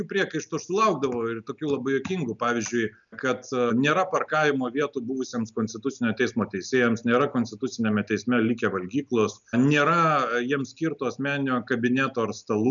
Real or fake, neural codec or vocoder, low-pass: real; none; 7.2 kHz